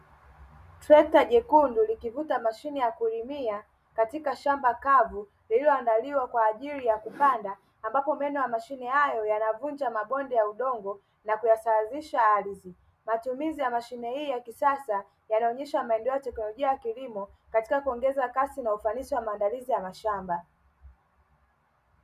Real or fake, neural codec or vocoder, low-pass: real; none; 14.4 kHz